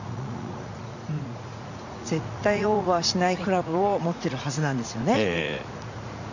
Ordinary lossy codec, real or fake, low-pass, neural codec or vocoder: none; fake; 7.2 kHz; vocoder, 44.1 kHz, 80 mel bands, Vocos